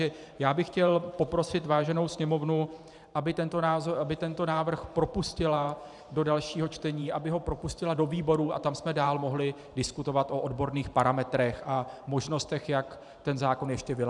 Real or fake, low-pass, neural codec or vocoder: fake; 10.8 kHz; vocoder, 44.1 kHz, 128 mel bands every 512 samples, BigVGAN v2